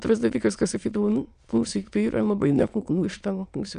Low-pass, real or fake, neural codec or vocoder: 9.9 kHz; fake; autoencoder, 22.05 kHz, a latent of 192 numbers a frame, VITS, trained on many speakers